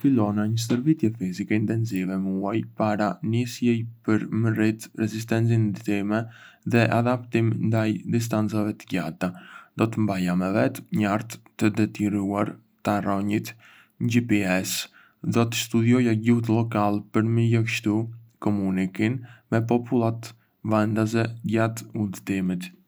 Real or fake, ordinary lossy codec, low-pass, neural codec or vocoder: real; none; none; none